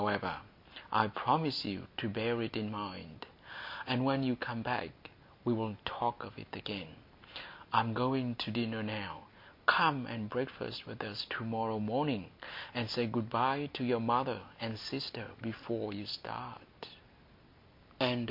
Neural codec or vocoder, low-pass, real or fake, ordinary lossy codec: none; 5.4 kHz; real; MP3, 48 kbps